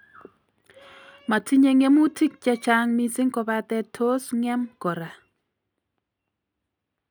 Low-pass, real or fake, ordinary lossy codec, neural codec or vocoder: none; real; none; none